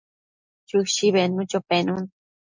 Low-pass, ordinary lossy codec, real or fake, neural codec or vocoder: 7.2 kHz; MP3, 64 kbps; real; none